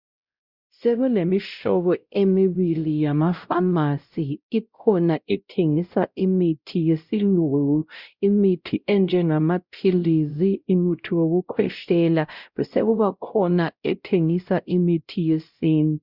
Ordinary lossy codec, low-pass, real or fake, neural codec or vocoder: Opus, 64 kbps; 5.4 kHz; fake; codec, 16 kHz, 0.5 kbps, X-Codec, WavLM features, trained on Multilingual LibriSpeech